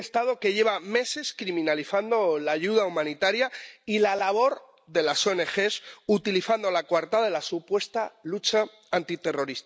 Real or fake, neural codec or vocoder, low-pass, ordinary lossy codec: real; none; none; none